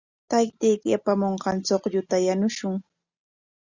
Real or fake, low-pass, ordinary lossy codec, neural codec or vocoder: real; 7.2 kHz; Opus, 64 kbps; none